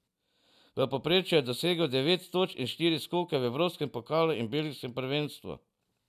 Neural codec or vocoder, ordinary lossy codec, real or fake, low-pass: none; none; real; 14.4 kHz